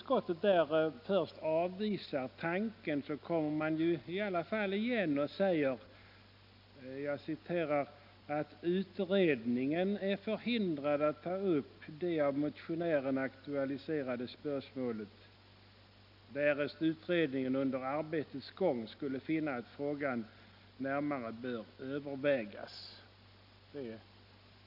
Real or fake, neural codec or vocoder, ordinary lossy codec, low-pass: real; none; none; 5.4 kHz